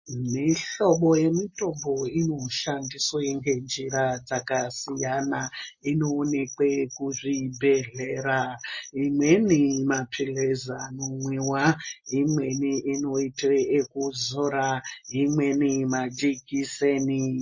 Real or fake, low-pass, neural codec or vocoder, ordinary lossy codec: real; 7.2 kHz; none; MP3, 32 kbps